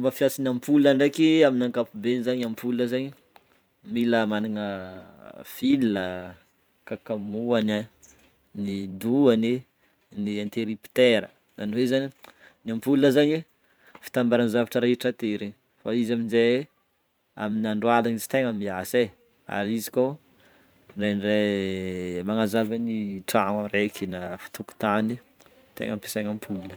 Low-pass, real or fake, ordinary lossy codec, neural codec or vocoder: none; fake; none; vocoder, 44.1 kHz, 128 mel bands every 512 samples, BigVGAN v2